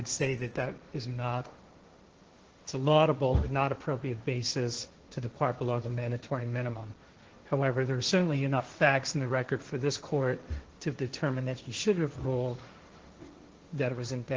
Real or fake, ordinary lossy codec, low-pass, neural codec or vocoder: fake; Opus, 16 kbps; 7.2 kHz; codec, 16 kHz, 1.1 kbps, Voila-Tokenizer